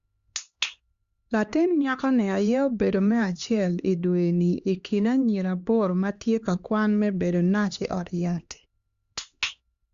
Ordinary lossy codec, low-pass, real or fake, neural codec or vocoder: Opus, 64 kbps; 7.2 kHz; fake; codec, 16 kHz, 2 kbps, X-Codec, HuBERT features, trained on LibriSpeech